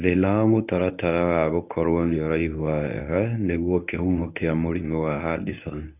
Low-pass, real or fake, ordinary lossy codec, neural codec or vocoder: 3.6 kHz; fake; none; codec, 24 kHz, 0.9 kbps, WavTokenizer, medium speech release version 1